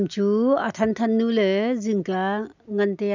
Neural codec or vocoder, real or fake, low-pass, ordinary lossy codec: none; real; 7.2 kHz; none